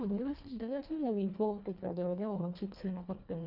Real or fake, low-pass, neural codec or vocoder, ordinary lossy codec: fake; 5.4 kHz; codec, 24 kHz, 1.5 kbps, HILCodec; none